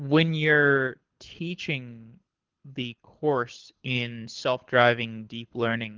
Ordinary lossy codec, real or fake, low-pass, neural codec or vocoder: Opus, 16 kbps; fake; 7.2 kHz; codec, 24 kHz, 6 kbps, HILCodec